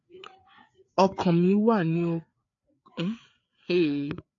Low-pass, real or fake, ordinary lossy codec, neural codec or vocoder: 7.2 kHz; fake; AAC, 48 kbps; codec, 16 kHz, 4 kbps, FreqCodec, larger model